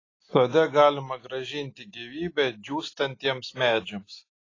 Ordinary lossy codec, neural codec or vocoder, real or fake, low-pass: AAC, 32 kbps; none; real; 7.2 kHz